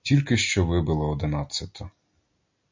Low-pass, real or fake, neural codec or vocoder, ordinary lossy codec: 7.2 kHz; real; none; MP3, 48 kbps